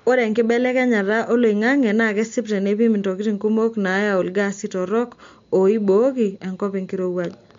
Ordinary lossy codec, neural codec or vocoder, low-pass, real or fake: MP3, 48 kbps; none; 7.2 kHz; real